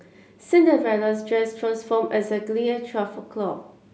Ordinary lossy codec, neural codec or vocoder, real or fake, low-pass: none; none; real; none